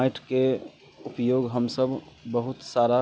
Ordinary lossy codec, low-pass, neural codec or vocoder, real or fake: none; none; none; real